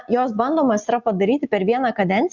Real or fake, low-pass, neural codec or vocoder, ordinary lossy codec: real; 7.2 kHz; none; Opus, 64 kbps